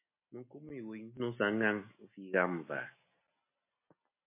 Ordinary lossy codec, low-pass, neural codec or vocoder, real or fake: MP3, 24 kbps; 3.6 kHz; none; real